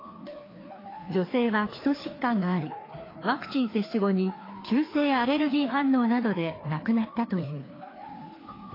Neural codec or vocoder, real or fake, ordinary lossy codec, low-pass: codec, 16 kHz, 2 kbps, FreqCodec, larger model; fake; AAC, 24 kbps; 5.4 kHz